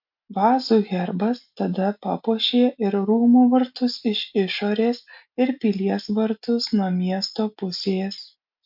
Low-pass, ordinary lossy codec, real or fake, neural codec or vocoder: 5.4 kHz; MP3, 48 kbps; real; none